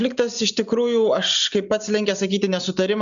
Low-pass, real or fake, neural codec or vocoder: 7.2 kHz; real; none